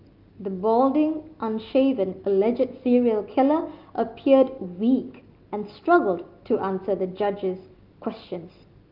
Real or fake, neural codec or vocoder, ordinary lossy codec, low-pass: real; none; Opus, 32 kbps; 5.4 kHz